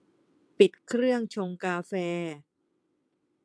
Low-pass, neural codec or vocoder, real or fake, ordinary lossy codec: none; vocoder, 22.05 kHz, 80 mel bands, WaveNeXt; fake; none